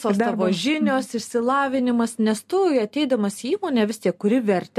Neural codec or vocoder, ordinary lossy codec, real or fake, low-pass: none; MP3, 64 kbps; real; 14.4 kHz